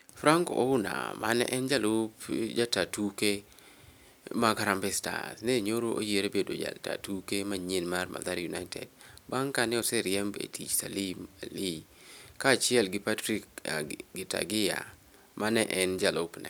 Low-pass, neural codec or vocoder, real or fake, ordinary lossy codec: none; none; real; none